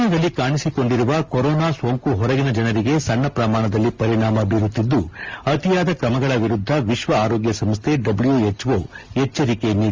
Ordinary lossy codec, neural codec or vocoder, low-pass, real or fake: Opus, 32 kbps; none; 7.2 kHz; real